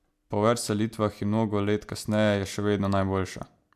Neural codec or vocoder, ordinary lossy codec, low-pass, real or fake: none; MP3, 96 kbps; 14.4 kHz; real